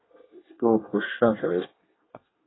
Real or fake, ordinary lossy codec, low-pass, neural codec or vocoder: fake; AAC, 16 kbps; 7.2 kHz; codec, 24 kHz, 1 kbps, SNAC